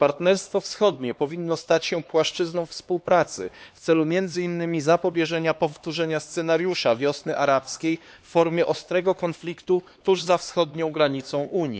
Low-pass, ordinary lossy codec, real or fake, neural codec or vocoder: none; none; fake; codec, 16 kHz, 2 kbps, X-Codec, HuBERT features, trained on LibriSpeech